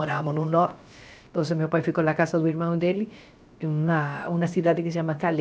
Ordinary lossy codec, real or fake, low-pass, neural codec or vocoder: none; fake; none; codec, 16 kHz, about 1 kbps, DyCAST, with the encoder's durations